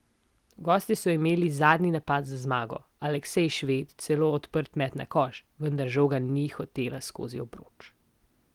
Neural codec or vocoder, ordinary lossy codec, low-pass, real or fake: none; Opus, 24 kbps; 19.8 kHz; real